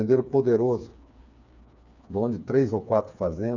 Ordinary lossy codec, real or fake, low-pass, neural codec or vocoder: none; fake; 7.2 kHz; codec, 16 kHz, 4 kbps, FreqCodec, smaller model